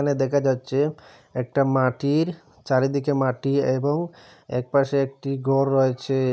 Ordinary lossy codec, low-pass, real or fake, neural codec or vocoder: none; none; real; none